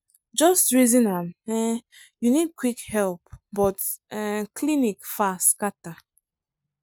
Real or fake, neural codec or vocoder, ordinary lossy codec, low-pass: real; none; none; none